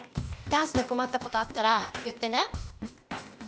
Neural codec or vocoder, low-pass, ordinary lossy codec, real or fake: codec, 16 kHz, 0.8 kbps, ZipCodec; none; none; fake